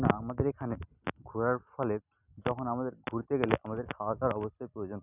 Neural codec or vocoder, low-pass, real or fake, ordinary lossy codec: none; 3.6 kHz; real; none